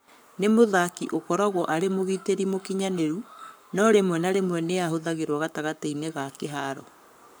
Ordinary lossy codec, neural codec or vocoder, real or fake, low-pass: none; codec, 44.1 kHz, 7.8 kbps, Pupu-Codec; fake; none